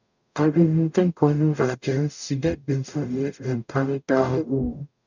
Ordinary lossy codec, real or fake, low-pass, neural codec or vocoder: none; fake; 7.2 kHz; codec, 44.1 kHz, 0.9 kbps, DAC